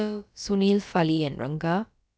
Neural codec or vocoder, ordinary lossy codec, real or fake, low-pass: codec, 16 kHz, about 1 kbps, DyCAST, with the encoder's durations; none; fake; none